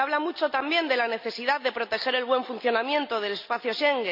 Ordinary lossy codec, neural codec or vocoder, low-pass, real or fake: none; none; 5.4 kHz; real